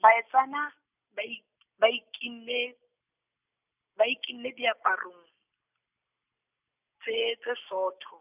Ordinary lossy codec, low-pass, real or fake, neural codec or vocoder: none; 3.6 kHz; real; none